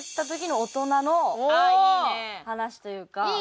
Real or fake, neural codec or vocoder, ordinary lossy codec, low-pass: real; none; none; none